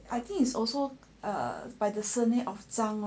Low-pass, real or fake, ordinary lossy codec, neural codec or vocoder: none; real; none; none